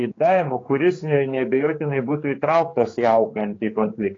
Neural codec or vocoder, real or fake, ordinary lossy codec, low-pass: codec, 16 kHz, 4 kbps, X-Codec, HuBERT features, trained on general audio; fake; AAC, 32 kbps; 7.2 kHz